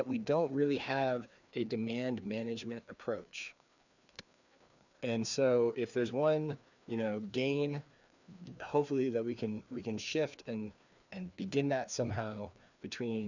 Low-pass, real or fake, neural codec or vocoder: 7.2 kHz; fake; codec, 16 kHz, 2 kbps, FreqCodec, larger model